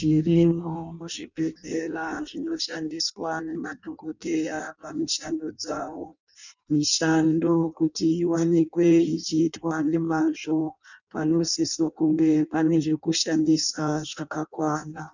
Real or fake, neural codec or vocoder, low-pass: fake; codec, 16 kHz in and 24 kHz out, 0.6 kbps, FireRedTTS-2 codec; 7.2 kHz